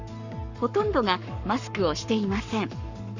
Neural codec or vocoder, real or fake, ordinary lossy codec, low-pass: codec, 44.1 kHz, 7.8 kbps, Pupu-Codec; fake; none; 7.2 kHz